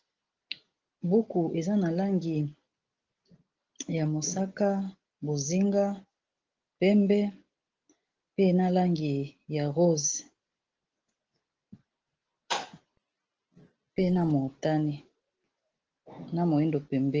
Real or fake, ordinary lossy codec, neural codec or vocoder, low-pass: real; Opus, 16 kbps; none; 7.2 kHz